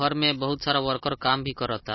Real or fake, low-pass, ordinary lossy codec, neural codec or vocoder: real; 7.2 kHz; MP3, 24 kbps; none